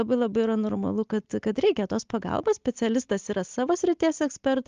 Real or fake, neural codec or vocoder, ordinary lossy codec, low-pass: real; none; Opus, 32 kbps; 7.2 kHz